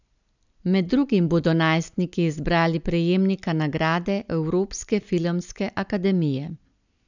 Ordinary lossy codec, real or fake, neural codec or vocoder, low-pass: none; real; none; 7.2 kHz